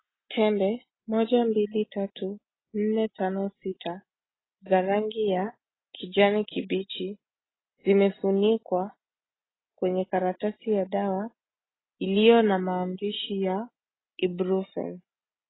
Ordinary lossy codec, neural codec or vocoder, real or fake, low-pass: AAC, 16 kbps; none; real; 7.2 kHz